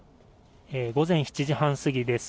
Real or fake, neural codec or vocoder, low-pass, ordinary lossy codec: real; none; none; none